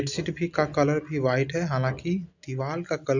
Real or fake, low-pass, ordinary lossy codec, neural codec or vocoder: real; 7.2 kHz; none; none